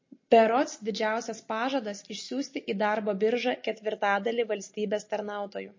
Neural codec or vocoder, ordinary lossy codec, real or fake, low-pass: none; MP3, 32 kbps; real; 7.2 kHz